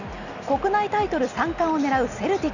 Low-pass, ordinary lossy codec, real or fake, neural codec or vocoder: 7.2 kHz; none; real; none